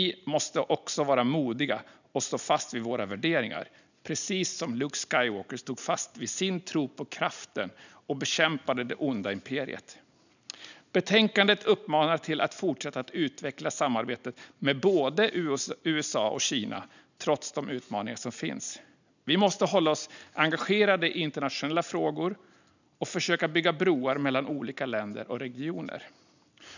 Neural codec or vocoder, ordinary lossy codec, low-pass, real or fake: none; none; 7.2 kHz; real